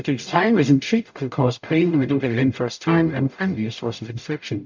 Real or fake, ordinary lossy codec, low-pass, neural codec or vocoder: fake; MP3, 64 kbps; 7.2 kHz; codec, 44.1 kHz, 0.9 kbps, DAC